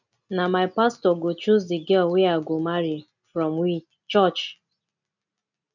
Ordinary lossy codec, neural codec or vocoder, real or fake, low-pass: none; none; real; 7.2 kHz